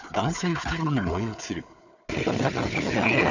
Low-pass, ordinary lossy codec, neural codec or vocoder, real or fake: 7.2 kHz; none; codec, 16 kHz, 4 kbps, FunCodec, trained on Chinese and English, 50 frames a second; fake